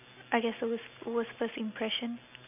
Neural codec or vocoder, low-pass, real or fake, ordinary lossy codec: none; 3.6 kHz; real; none